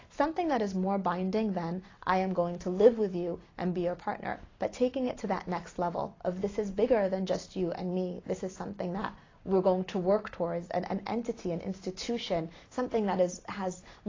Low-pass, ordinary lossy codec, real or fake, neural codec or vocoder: 7.2 kHz; AAC, 32 kbps; real; none